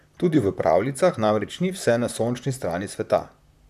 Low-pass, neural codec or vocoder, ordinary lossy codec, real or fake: 14.4 kHz; vocoder, 44.1 kHz, 128 mel bands, Pupu-Vocoder; none; fake